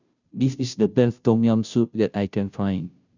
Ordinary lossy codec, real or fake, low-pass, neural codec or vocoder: none; fake; 7.2 kHz; codec, 16 kHz, 0.5 kbps, FunCodec, trained on Chinese and English, 25 frames a second